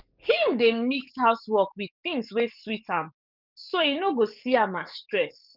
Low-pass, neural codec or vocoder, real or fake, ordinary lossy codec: 5.4 kHz; codec, 44.1 kHz, 7.8 kbps, DAC; fake; none